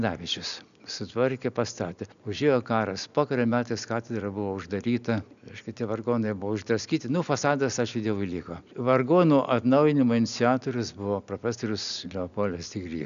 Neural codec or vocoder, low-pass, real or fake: none; 7.2 kHz; real